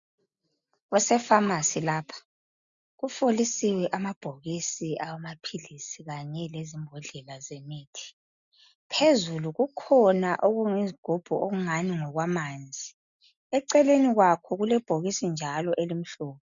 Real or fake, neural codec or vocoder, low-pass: real; none; 7.2 kHz